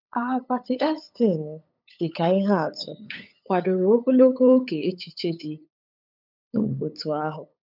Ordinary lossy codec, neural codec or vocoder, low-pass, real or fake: none; codec, 16 kHz, 8 kbps, FunCodec, trained on LibriTTS, 25 frames a second; 5.4 kHz; fake